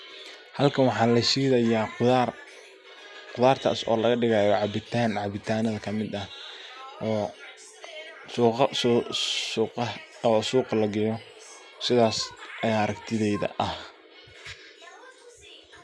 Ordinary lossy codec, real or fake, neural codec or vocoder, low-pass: none; real; none; none